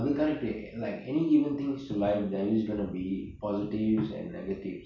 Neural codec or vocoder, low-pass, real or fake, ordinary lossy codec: none; 7.2 kHz; real; none